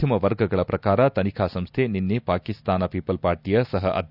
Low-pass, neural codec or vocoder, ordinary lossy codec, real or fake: 5.4 kHz; none; none; real